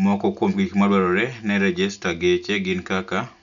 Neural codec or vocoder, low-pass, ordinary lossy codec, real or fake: none; 7.2 kHz; none; real